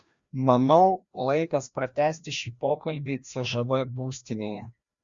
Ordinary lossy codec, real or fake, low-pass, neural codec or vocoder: Opus, 64 kbps; fake; 7.2 kHz; codec, 16 kHz, 1 kbps, FreqCodec, larger model